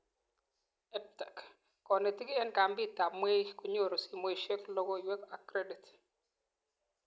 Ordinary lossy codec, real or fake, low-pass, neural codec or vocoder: none; real; none; none